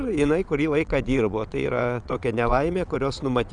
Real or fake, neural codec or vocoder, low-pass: fake; vocoder, 22.05 kHz, 80 mel bands, WaveNeXt; 9.9 kHz